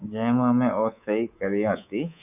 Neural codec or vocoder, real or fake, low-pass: codec, 24 kHz, 3.1 kbps, DualCodec; fake; 3.6 kHz